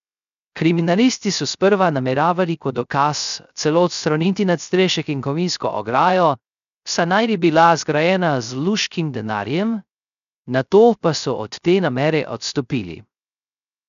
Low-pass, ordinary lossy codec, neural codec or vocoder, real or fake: 7.2 kHz; none; codec, 16 kHz, 0.3 kbps, FocalCodec; fake